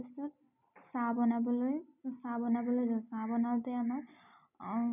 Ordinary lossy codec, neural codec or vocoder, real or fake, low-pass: none; none; real; 3.6 kHz